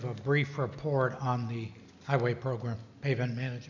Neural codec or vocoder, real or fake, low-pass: none; real; 7.2 kHz